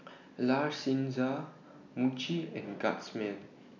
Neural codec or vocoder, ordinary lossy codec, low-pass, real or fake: none; none; 7.2 kHz; real